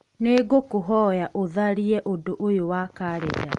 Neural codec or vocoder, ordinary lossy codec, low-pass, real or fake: none; Opus, 32 kbps; 10.8 kHz; real